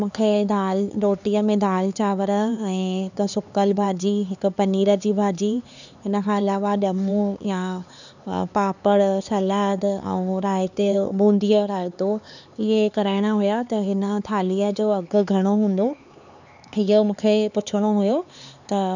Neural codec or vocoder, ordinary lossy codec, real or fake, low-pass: codec, 16 kHz, 4 kbps, X-Codec, HuBERT features, trained on LibriSpeech; none; fake; 7.2 kHz